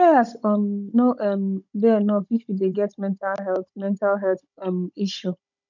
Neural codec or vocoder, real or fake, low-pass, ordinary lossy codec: codec, 16 kHz, 16 kbps, FunCodec, trained on Chinese and English, 50 frames a second; fake; 7.2 kHz; none